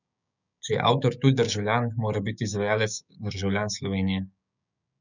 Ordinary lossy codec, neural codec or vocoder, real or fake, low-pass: none; codec, 16 kHz, 6 kbps, DAC; fake; 7.2 kHz